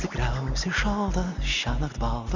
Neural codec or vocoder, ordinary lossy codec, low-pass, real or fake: none; Opus, 64 kbps; 7.2 kHz; real